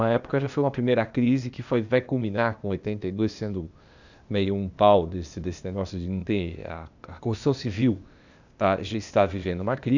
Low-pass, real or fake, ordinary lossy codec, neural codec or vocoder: 7.2 kHz; fake; none; codec, 16 kHz, 0.8 kbps, ZipCodec